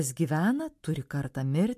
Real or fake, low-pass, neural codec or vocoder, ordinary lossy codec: real; 14.4 kHz; none; MP3, 64 kbps